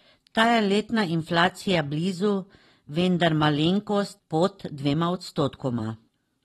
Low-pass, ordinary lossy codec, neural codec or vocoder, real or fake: 19.8 kHz; AAC, 32 kbps; vocoder, 44.1 kHz, 128 mel bands every 512 samples, BigVGAN v2; fake